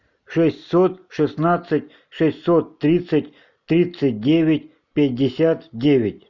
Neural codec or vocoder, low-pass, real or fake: none; 7.2 kHz; real